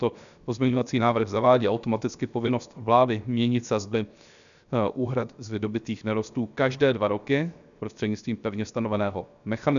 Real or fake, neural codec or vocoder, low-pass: fake; codec, 16 kHz, 0.7 kbps, FocalCodec; 7.2 kHz